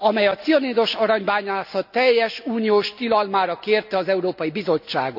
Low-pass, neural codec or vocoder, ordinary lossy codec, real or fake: 5.4 kHz; none; none; real